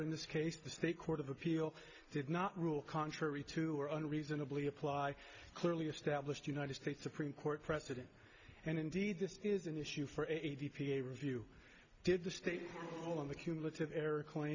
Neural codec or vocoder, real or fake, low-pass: none; real; 7.2 kHz